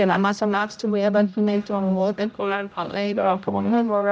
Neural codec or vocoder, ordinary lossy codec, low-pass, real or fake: codec, 16 kHz, 0.5 kbps, X-Codec, HuBERT features, trained on general audio; none; none; fake